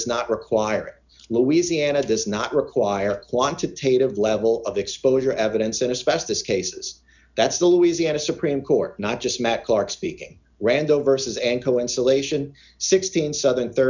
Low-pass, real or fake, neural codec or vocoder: 7.2 kHz; real; none